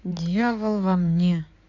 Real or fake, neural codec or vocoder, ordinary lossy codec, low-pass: fake; codec, 16 kHz in and 24 kHz out, 2.2 kbps, FireRedTTS-2 codec; none; 7.2 kHz